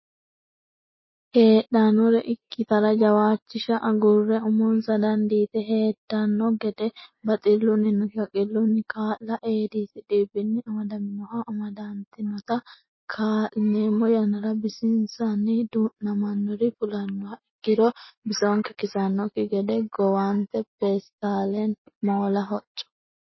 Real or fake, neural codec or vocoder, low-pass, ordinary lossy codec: real; none; 7.2 kHz; MP3, 24 kbps